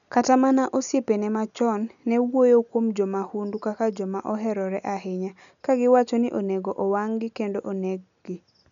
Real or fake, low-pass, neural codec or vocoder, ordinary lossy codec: real; 7.2 kHz; none; none